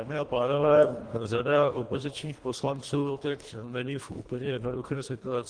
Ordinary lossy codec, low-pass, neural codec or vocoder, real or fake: Opus, 24 kbps; 9.9 kHz; codec, 24 kHz, 1.5 kbps, HILCodec; fake